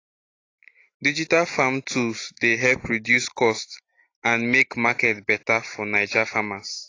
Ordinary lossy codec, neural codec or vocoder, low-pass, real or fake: AAC, 32 kbps; none; 7.2 kHz; real